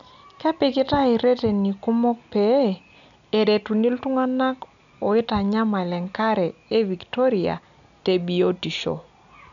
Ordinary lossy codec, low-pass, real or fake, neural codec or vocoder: none; 7.2 kHz; real; none